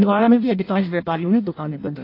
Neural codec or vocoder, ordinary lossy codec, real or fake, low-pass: codec, 16 kHz in and 24 kHz out, 0.6 kbps, FireRedTTS-2 codec; AAC, 32 kbps; fake; 5.4 kHz